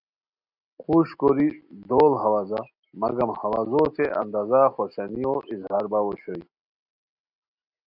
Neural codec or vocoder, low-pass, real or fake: none; 5.4 kHz; real